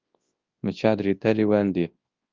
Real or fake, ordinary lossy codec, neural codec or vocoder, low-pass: fake; Opus, 24 kbps; codec, 24 kHz, 0.9 kbps, WavTokenizer, large speech release; 7.2 kHz